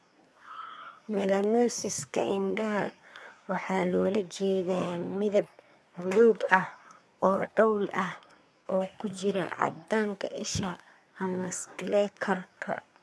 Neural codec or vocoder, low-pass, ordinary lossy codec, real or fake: codec, 24 kHz, 1 kbps, SNAC; none; none; fake